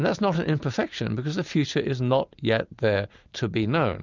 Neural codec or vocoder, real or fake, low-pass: none; real; 7.2 kHz